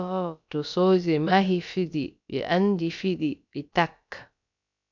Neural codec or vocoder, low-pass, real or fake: codec, 16 kHz, about 1 kbps, DyCAST, with the encoder's durations; 7.2 kHz; fake